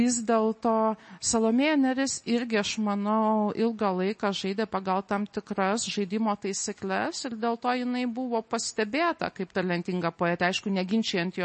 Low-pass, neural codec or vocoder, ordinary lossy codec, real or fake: 10.8 kHz; none; MP3, 32 kbps; real